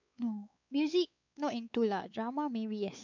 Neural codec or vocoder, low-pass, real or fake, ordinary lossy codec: codec, 16 kHz, 4 kbps, X-Codec, WavLM features, trained on Multilingual LibriSpeech; 7.2 kHz; fake; none